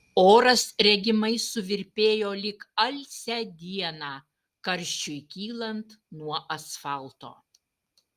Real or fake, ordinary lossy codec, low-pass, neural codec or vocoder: real; Opus, 32 kbps; 14.4 kHz; none